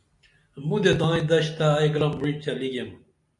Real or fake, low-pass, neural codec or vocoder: real; 10.8 kHz; none